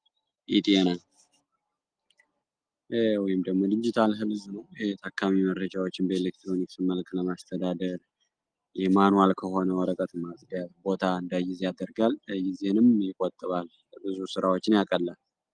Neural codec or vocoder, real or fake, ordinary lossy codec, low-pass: none; real; Opus, 24 kbps; 9.9 kHz